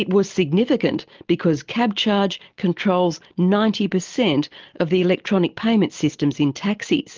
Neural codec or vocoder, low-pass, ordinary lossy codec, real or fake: none; 7.2 kHz; Opus, 24 kbps; real